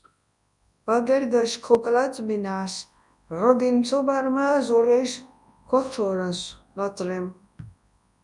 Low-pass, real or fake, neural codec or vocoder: 10.8 kHz; fake; codec, 24 kHz, 0.9 kbps, WavTokenizer, large speech release